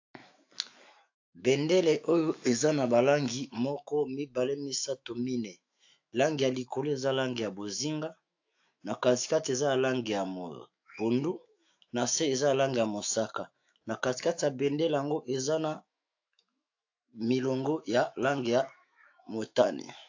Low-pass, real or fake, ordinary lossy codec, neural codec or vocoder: 7.2 kHz; fake; AAC, 48 kbps; autoencoder, 48 kHz, 128 numbers a frame, DAC-VAE, trained on Japanese speech